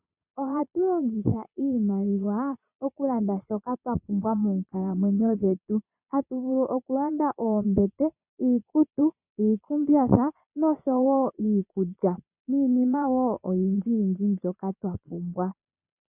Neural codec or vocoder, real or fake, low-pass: vocoder, 22.05 kHz, 80 mel bands, Vocos; fake; 3.6 kHz